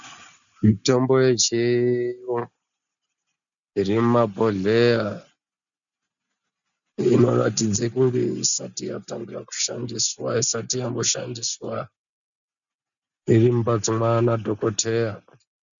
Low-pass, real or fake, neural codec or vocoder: 7.2 kHz; real; none